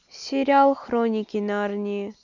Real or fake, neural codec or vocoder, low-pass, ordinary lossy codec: real; none; 7.2 kHz; none